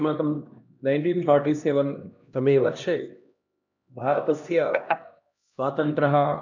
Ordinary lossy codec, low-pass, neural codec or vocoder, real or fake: none; 7.2 kHz; codec, 16 kHz, 1 kbps, X-Codec, HuBERT features, trained on LibriSpeech; fake